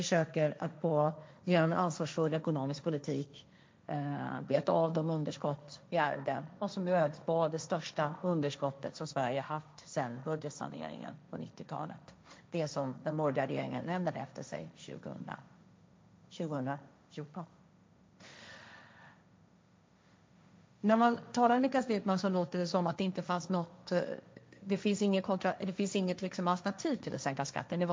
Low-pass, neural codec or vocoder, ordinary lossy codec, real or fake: none; codec, 16 kHz, 1.1 kbps, Voila-Tokenizer; none; fake